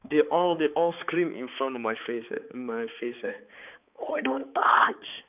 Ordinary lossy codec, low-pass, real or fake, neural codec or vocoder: none; 3.6 kHz; fake; codec, 16 kHz, 4 kbps, X-Codec, HuBERT features, trained on balanced general audio